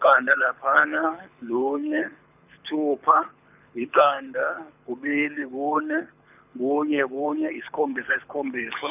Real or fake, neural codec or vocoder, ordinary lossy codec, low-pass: fake; codec, 24 kHz, 6 kbps, HILCodec; none; 3.6 kHz